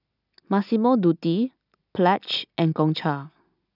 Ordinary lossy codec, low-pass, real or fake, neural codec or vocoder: none; 5.4 kHz; real; none